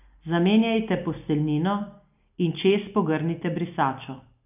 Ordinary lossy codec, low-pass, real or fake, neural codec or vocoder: none; 3.6 kHz; real; none